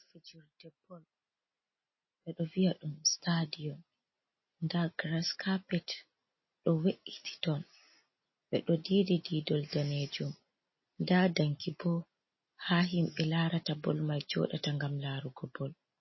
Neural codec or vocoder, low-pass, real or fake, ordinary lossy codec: none; 7.2 kHz; real; MP3, 24 kbps